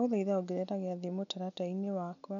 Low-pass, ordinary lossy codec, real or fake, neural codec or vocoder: 7.2 kHz; none; real; none